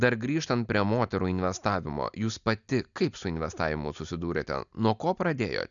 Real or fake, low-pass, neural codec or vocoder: real; 7.2 kHz; none